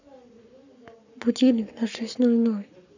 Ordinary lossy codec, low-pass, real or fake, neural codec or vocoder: none; 7.2 kHz; fake; codec, 44.1 kHz, 7.8 kbps, Pupu-Codec